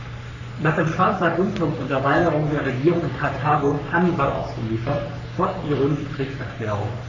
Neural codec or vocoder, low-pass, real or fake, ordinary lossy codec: codec, 44.1 kHz, 3.4 kbps, Pupu-Codec; 7.2 kHz; fake; none